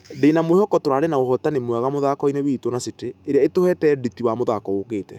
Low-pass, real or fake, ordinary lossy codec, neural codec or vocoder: 19.8 kHz; fake; none; autoencoder, 48 kHz, 128 numbers a frame, DAC-VAE, trained on Japanese speech